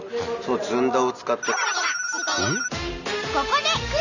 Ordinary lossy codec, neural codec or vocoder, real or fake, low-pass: none; none; real; 7.2 kHz